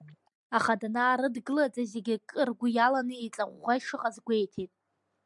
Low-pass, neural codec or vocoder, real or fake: 10.8 kHz; none; real